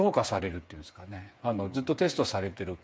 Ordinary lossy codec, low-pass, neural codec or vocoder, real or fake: none; none; codec, 16 kHz, 8 kbps, FreqCodec, smaller model; fake